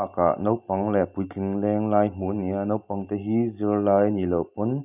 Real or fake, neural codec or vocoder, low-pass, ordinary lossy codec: real; none; 3.6 kHz; none